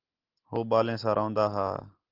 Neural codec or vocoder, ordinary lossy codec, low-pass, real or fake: none; Opus, 16 kbps; 5.4 kHz; real